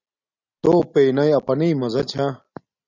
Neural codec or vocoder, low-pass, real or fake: none; 7.2 kHz; real